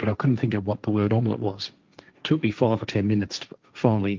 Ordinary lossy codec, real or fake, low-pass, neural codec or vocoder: Opus, 24 kbps; fake; 7.2 kHz; codec, 16 kHz, 1.1 kbps, Voila-Tokenizer